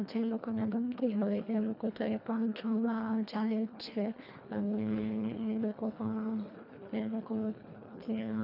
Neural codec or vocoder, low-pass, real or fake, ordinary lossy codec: codec, 24 kHz, 1.5 kbps, HILCodec; 5.4 kHz; fake; none